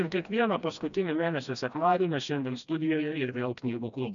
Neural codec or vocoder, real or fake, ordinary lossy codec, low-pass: codec, 16 kHz, 1 kbps, FreqCodec, smaller model; fake; MP3, 96 kbps; 7.2 kHz